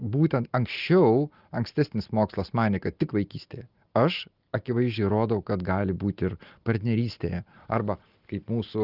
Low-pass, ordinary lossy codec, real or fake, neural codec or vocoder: 5.4 kHz; Opus, 32 kbps; real; none